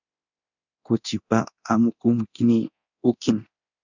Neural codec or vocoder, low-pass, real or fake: codec, 24 kHz, 0.9 kbps, DualCodec; 7.2 kHz; fake